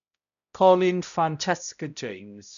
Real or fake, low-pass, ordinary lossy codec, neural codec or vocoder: fake; 7.2 kHz; none; codec, 16 kHz, 0.5 kbps, X-Codec, HuBERT features, trained on balanced general audio